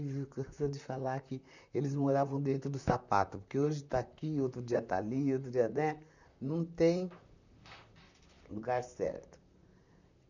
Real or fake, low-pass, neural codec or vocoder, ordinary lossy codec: fake; 7.2 kHz; vocoder, 44.1 kHz, 128 mel bands, Pupu-Vocoder; none